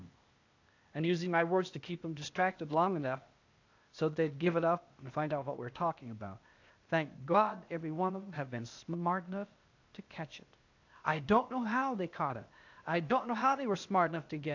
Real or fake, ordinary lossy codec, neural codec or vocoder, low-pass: fake; AAC, 48 kbps; codec, 16 kHz, 0.8 kbps, ZipCodec; 7.2 kHz